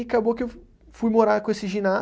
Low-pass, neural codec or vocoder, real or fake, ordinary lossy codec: none; none; real; none